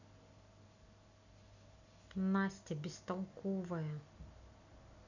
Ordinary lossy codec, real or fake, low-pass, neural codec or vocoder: none; real; 7.2 kHz; none